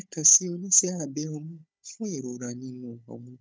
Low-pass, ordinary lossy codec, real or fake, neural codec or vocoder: none; none; fake; codec, 16 kHz, 16 kbps, FunCodec, trained on Chinese and English, 50 frames a second